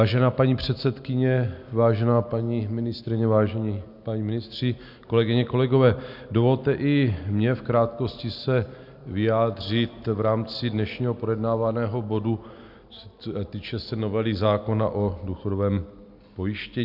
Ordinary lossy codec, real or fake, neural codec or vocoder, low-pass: MP3, 48 kbps; real; none; 5.4 kHz